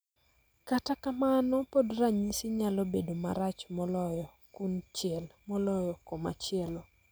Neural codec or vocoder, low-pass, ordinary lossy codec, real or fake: none; none; none; real